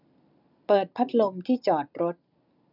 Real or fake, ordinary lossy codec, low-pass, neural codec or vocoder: real; none; 5.4 kHz; none